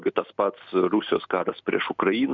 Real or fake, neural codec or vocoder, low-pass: real; none; 7.2 kHz